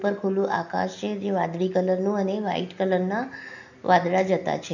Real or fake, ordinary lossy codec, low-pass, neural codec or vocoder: real; none; 7.2 kHz; none